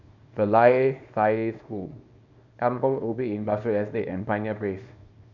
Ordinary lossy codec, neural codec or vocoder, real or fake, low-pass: none; codec, 24 kHz, 0.9 kbps, WavTokenizer, small release; fake; 7.2 kHz